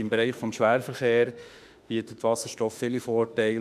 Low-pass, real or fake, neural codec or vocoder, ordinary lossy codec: 14.4 kHz; fake; autoencoder, 48 kHz, 32 numbers a frame, DAC-VAE, trained on Japanese speech; none